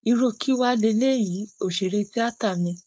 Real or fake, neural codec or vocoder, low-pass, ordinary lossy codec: fake; codec, 16 kHz, 4.8 kbps, FACodec; none; none